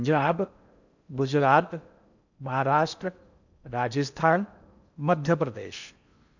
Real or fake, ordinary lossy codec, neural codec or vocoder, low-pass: fake; none; codec, 16 kHz in and 24 kHz out, 0.6 kbps, FocalCodec, streaming, 4096 codes; 7.2 kHz